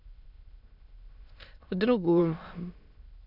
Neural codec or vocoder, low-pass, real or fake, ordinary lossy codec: autoencoder, 22.05 kHz, a latent of 192 numbers a frame, VITS, trained on many speakers; 5.4 kHz; fake; none